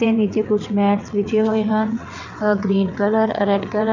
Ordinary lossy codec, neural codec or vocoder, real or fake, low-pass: none; vocoder, 22.05 kHz, 80 mel bands, WaveNeXt; fake; 7.2 kHz